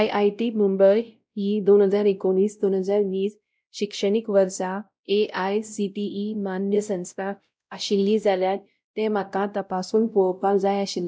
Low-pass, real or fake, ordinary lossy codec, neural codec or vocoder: none; fake; none; codec, 16 kHz, 0.5 kbps, X-Codec, WavLM features, trained on Multilingual LibriSpeech